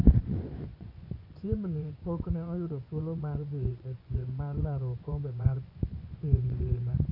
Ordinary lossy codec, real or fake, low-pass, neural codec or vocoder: none; fake; 5.4 kHz; vocoder, 24 kHz, 100 mel bands, Vocos